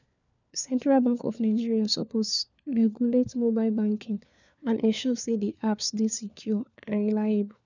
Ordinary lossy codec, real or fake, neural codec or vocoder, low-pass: none; fake; codec, 16 kHz, 4 kbps, FunCodec, trained on LibriTTS, 50 frames a second; 7.2 kHz